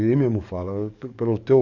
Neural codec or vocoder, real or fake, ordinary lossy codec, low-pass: codec, 44.1 kHz, 7.8 kbps, DAC; fake; none; 7.2 kHz